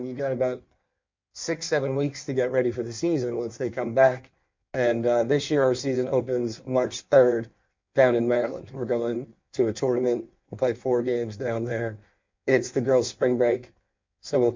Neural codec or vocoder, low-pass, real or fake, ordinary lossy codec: codec, 16 kHz in and 24 kHz out, 1.1 kbps, FireRedTTS-2 codec; 7.2 kHz; fake; MP3, 64 kbps